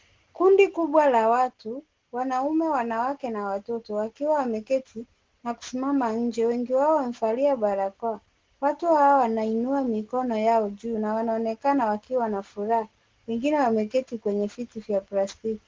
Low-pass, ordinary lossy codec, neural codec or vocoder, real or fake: 7.2 kHz; Opus, 16 kbps; none; real